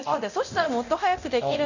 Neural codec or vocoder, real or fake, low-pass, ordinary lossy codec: codec, 24 kHz, 0.9 kbps, DualCodec; fake; 7.2 kHz; none